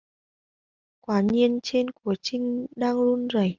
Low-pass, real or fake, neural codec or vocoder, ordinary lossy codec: 7.2 kHz; real; none; Opus, 32 kbps